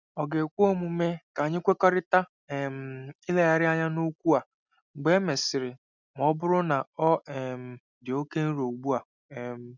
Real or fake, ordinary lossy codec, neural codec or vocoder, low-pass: real; none; none; 7.2 kHz